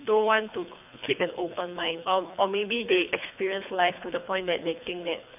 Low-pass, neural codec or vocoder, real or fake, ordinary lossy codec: 3.6 kHz; codec, 24 kHz, 3 kbps, HILCodec; fake; none